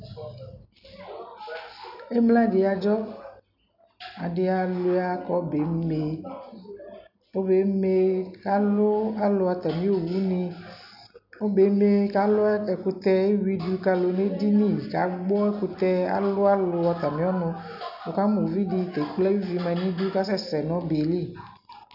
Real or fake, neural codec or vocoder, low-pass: real; none; 5.4 kHz